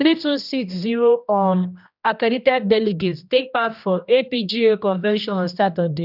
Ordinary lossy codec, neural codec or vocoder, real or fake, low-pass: none; codec, 16 kHz, 1 kbps, X-Codec, HuBERT features, trained on general audio; fake; 5.4 kHz